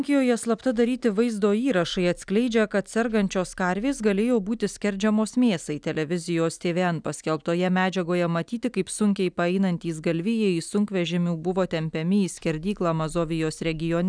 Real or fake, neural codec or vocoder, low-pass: real; none; 9.9 kHz